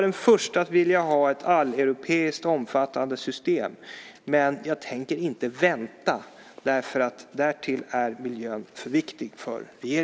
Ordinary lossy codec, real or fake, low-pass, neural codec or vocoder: none; real; none; none